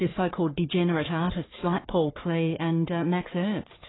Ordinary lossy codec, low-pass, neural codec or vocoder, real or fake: AAC, 16 kbps; 7.2 kHz; codec, 44.1 kHz, 3.4 kbps, Pupu-Codec; fake